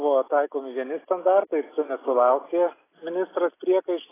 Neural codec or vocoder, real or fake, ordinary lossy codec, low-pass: none; real; AAC, 16 kbps; 3.6 kHz